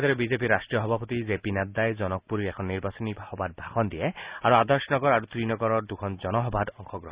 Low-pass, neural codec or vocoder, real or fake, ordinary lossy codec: 3.6 kHz; none; real; Opus, 64 kbps